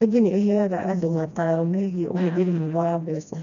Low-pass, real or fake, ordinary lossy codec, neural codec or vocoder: 7.2 kHz; fake; none; codec, 16 kHz, 1 kbps, FreqCodec, smaller model